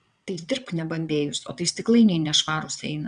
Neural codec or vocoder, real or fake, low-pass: vocoder, 22.05 kHz, 80 mel bands, WaveNeXt; fake; 9.9 kHz